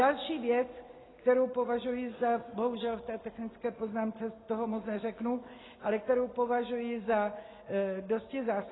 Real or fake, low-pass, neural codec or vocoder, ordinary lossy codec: real; 7.2 kHz; none; AAC, 16 kbps